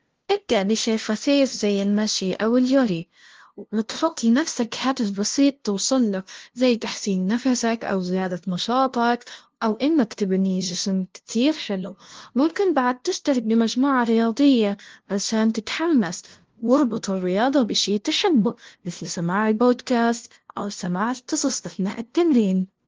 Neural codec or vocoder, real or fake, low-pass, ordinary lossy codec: codec, 16 kHz, 0.5 kbps, FunCodec, trained on LibriTTS, 25 frames a second; fake; 7.2 kHz; Opus, 16 kbps